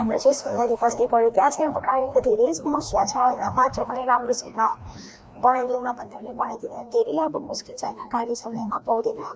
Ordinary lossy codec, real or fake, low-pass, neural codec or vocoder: none; fake; none; codec, 16 kHz, 1 kbps, FreqCodec, larger model